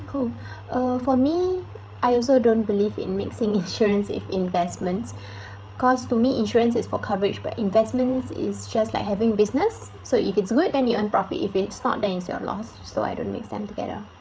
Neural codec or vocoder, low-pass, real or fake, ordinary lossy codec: codec, 16 kHz, 8 kbps, FreqCodec, larger model; none; fake; none